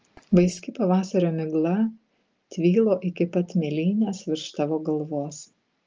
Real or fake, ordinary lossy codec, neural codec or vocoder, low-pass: real; Opus, 24 kbps; none; 7.2 kHz